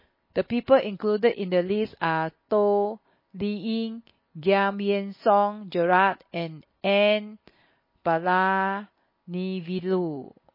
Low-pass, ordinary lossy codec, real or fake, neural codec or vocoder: 5.4 kHz; MP3, 24 kbps; real; none